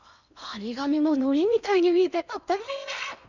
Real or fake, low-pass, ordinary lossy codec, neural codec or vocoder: fake; 7.2 kHz; none; codec, 16 kHz in and 24 kHz out, 0.8 kbps, FocalCodec, streaming, 65536 codes